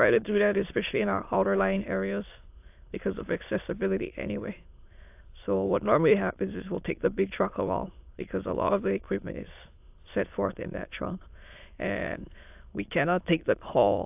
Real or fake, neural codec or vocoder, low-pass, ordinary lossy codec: fake; autoencoder, 22.05 kHz, a latent of 192 numbers a frame, VITS, trained on many speakers; 3.6 kHz; AAC, 32 kbps